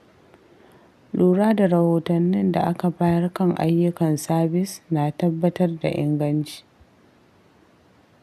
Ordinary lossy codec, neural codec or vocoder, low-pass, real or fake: none; none; 14.4 kHz; real